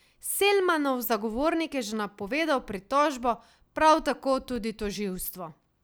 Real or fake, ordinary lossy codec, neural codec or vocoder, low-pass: real; none; none; none